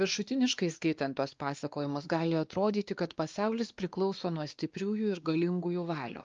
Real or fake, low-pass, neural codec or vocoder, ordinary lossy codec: fake; 7.2 kHz; codec, 16 kHz, 2 kbps, X-Codec, WavLM features, trained on Multilingual LibriSpeech; Opus, 24 kbps